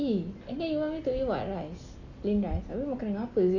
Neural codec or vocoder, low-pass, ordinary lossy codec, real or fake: none; 7.2 kHz; none; real